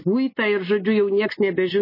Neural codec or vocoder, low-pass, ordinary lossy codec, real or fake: none; 5.4 kHz; MP3, 24 kbps; real